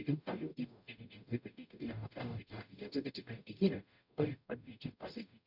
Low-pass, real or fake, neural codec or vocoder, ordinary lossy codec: 5.4 kHz; fake; codec, 44.1 kHz, 0.9 kbps, DAC; none